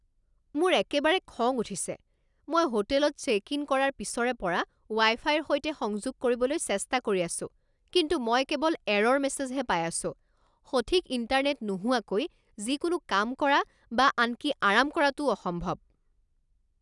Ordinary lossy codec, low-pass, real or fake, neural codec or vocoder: none; 10.8 kHz; real; none